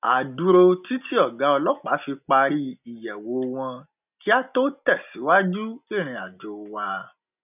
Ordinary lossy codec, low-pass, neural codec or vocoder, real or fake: none; 3.6 kHz; none; real